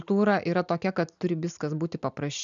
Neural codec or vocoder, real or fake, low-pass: none; real; 7.2 kHz